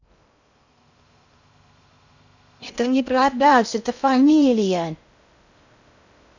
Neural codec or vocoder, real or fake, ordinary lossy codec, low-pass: codec, 16 kHz in and 24 kHz out, 0.6 kbps, FocalCodec, streaming, 2048 codes; fake; none; 7.2 kHz